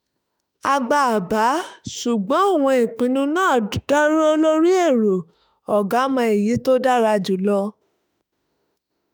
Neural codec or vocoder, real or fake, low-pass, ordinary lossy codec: autoencoder, 48 kHz, 32 numbers a frame, DAC-VAE, trained on Japanese speech; fake; none; none